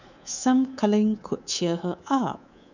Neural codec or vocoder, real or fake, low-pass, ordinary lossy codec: codec, 24 kHz, 3.1 kbps, DualCodec; fake; 7.2 kHz; none